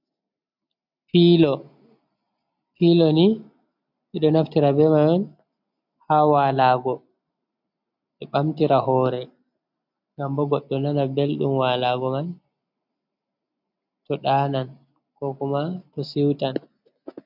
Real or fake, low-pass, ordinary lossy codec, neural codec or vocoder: real; 5.4 kHz; MP3, 48 kbps; none